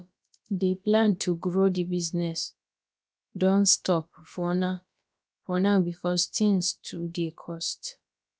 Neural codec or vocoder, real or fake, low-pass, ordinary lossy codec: codec, 16 kHz, about 1 kbps, DyCAST, with the encoder's durations; fake; none; none